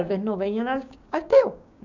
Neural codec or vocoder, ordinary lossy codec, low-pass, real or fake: codec, 16 kHz, 6 kbps, DAC; none; 7.2 kHz; fake